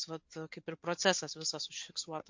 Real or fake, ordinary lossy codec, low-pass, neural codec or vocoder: real; MP3, 48 kbps; 7.2 kHz; none